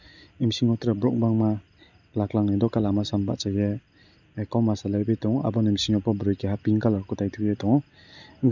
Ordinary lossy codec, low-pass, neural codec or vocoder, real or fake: none; 7.2 kHz; none; real